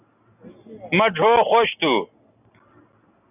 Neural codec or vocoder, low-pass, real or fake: none; 3.6 kHz; real